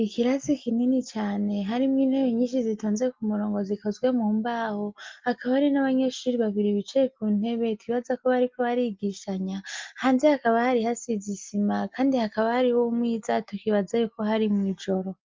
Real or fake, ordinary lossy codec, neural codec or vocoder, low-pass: real; Opus, 32 kbps; none; 7.2 kHz